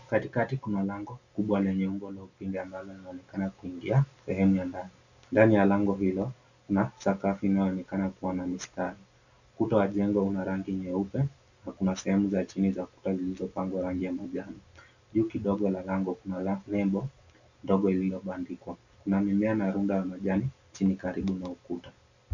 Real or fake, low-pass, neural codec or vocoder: real; 7.2 kHz; none